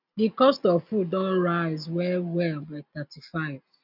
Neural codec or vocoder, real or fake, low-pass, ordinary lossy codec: none; real; 5.4 kHz; none